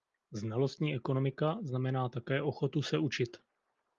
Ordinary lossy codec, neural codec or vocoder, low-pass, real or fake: Opus, 32 kbps; none; 7.2 kHz; real